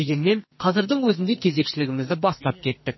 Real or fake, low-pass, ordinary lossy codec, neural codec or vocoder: fake; 7.2 kHz; MP3, 24 kbps; codec, 44.1 kHz, 2.6 kbps, SNAC